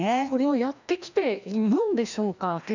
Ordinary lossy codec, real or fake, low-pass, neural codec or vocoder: none; fake; 7.2 kHz; codec, 16 kHz, 1 kbps, FreqCodec, larger model